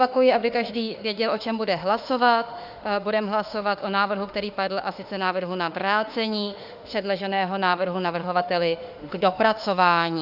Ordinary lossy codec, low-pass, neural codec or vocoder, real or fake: Opus, 64 kbps; 5.4 kHz; autoencoder, 48 kHz, 32 numbers a frame, DAC-VAE, trained on Japanese speech; fake